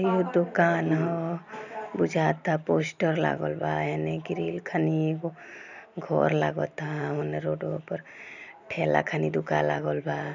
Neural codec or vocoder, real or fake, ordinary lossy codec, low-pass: none; real; none; 7.2 kHz